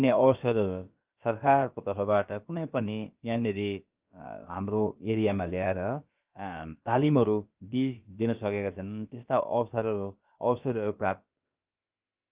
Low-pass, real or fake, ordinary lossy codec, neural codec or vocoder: 3.6 kHz; fake; Opus, 24 kbps; codec, 16 kHz, about 1 kbps, DyCAST, with the encoder's durations